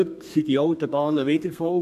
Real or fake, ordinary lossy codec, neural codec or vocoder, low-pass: fake; none; codec, 32 kHz, 1.9 kbps, SNAC; 14.4 kHz